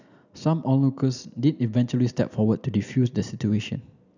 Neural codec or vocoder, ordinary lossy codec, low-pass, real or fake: none; none; 7.2 kHz; real